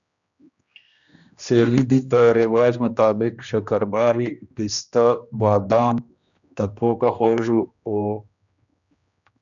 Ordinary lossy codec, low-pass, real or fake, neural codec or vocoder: MP3, 96 kbps; 7.2 kHz; fake; codec, 16 kHz, 1 kbps, X-Codec, HuBERT features, trained on general audio